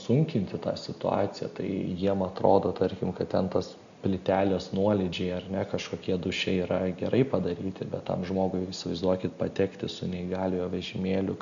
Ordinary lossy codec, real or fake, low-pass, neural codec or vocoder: MP3, 96 kbps; real; 7.2 kHz; none